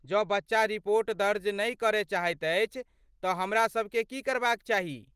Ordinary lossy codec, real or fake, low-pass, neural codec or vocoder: Opus, 24 kbps; real; 14.4 kHz; none